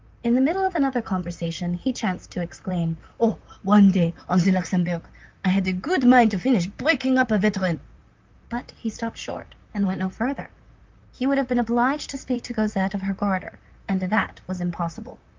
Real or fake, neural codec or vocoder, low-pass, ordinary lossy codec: fake; vocoder, 44.1 kHz, 128 mel bands, Pupu-Vocoder; 7.2 kHz; Opus, 24 kbps